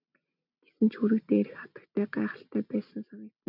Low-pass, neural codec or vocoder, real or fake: 5.4 kHz; none; real